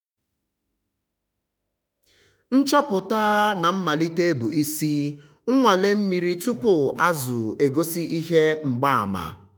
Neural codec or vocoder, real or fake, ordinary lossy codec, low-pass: autoencoder, 48 kHz, 32 numbers a frame, DAC-VAE, trained on Japanese speech; fake; none; none